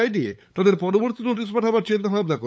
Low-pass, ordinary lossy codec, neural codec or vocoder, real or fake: none; none; codec, 16 kHz, 8 kbps, FunCodec, trained on LibriTTS, 25 frames a second; fake